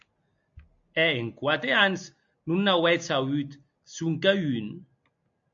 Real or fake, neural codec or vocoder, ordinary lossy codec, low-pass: real; none; AAC, 64 kbps; 7.2 kHz